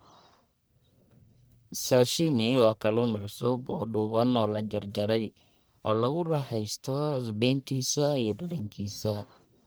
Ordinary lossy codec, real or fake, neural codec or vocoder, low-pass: none; fake; codec, 44.1 kHz, 1.7 kbps, Pupu-Codec; none